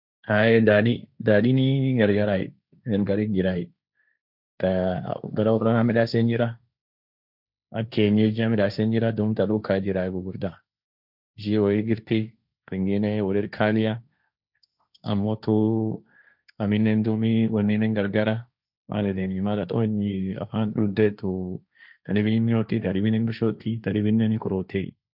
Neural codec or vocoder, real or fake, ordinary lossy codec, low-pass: codec, 16 kHz, 1.1 kbps, Voila-Tokenizer; fake; none; 5.4 kHz